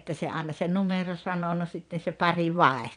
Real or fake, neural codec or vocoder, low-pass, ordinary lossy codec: fake; vocoder, 22.05 kHz, 80 mel bands, Vocos; 9.9 kHz; MP3, 96 kbps